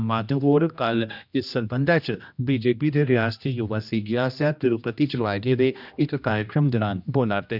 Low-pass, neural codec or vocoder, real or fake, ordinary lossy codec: 5.4 kHz; codec, 16 kHz, 1 kbps, X-Codec, HuBERT features, trained on general audio; fake; none